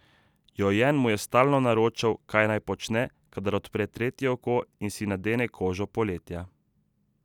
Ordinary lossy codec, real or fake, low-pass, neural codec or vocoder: none; real; 19.8 kHz; none